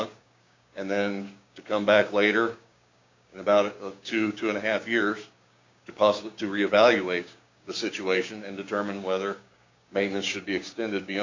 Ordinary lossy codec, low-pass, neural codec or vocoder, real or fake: MP3, 64 kbps; 7.2 kHz; codec, 16 kHz, 6 kbps, DAC; fake